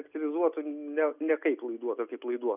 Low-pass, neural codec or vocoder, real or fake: 3.6 kHz; none; real